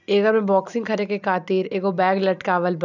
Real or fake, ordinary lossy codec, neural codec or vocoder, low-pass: real; none; none; 7.2 kHz